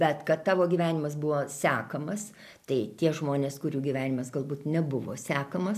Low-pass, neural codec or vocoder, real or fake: 14.4 kHz; none; real